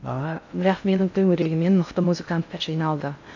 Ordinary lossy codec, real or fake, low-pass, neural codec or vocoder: MP3, 64 kbps; fake; 7.2 kHz; codec, 16 kHz in and 24 kHz out, 0.6 kbps, FocalCodec, streaming, 2048 codes